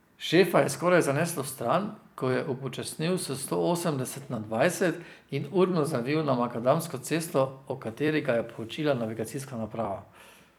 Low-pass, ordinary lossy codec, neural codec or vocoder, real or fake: none; none; vocoder, 44.1 kHz, 128 mel bands every 256 samples, BigVGAN v2; fake